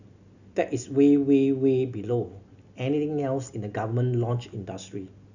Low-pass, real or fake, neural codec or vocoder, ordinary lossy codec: 7.2 kHz; real; none; none